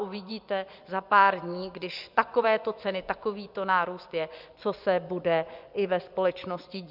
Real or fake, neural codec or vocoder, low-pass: fake; vocoder, 24 kHz, 100 mel bands, Vocos; 5.4 kHz